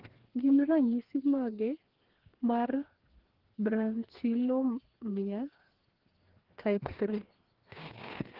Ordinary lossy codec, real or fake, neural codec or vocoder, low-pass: Opus, 16 kbps; fake; codec, 16 kHz, 2 kbps, FreqCodec, larger model; 5.4 kHz